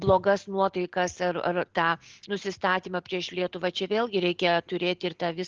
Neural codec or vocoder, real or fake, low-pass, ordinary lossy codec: none; real; 7.2 kHz; Opus, 16 kbps